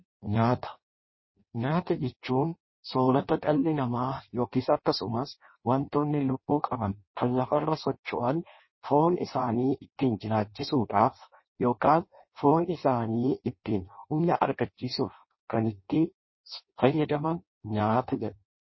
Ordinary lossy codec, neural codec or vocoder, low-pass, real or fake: MP3, 24 kbps; codec, 16 kHz in and 24 kHz out, 0.6 kbps, FireRedTTS-2 codec; 7.2 kHz; fake